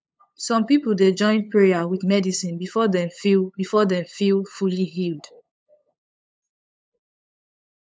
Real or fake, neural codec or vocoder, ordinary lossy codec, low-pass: fake; codec, 16 kHz, 8 kbps, FunCodec, trained on LibriTTS, 25 frames a second; none; none